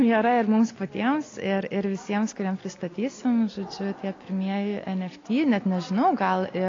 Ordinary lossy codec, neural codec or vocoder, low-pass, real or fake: AAC, 32 kbps; none; 7.2 kHz; real